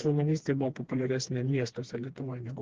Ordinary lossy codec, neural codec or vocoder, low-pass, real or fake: Opus, 16 kbps; codec, 16 kHz, 2 kbps, FreqCodec, smaller model; 7.2 kHz; fake